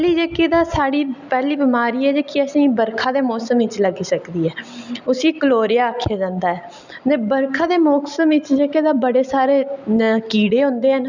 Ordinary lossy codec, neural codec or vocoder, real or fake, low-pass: none; none; real; 7.2 kHz